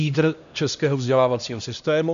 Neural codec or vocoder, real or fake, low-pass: codec, 16 kHz, 1 kbps, X-Codec, HuBERT features, trained on LibriSpeech; fake; 7.2 kHz